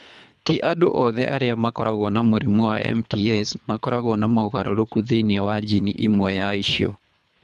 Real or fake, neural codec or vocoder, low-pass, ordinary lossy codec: fake; codec, 24 kHz, 3 kbps, HILCodec; none; none